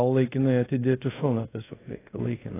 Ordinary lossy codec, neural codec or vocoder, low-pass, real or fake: AAC, 16 kbps; codec, 24 kHz, 0.5 kbps, DualCodec; 3.6 kHz; fake